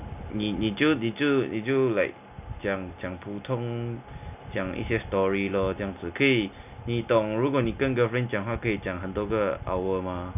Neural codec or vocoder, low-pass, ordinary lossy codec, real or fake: none; 3.6 kHz; none; real